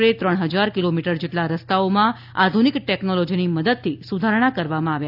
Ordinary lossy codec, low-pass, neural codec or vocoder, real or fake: AAC, 48 kbps; 5.4 kHz; none; real